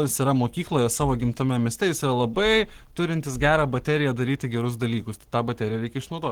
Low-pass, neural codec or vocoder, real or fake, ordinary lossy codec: 19.8 kHz; codec, 44.1 kHz, 7.8 kbps, Pupu-Codec; fake; Opus, 16 kbps